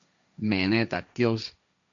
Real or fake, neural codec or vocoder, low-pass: fake; codec, 16 kHz, 1.1 kbps, Voila-Tokenizer; 7.2 kHz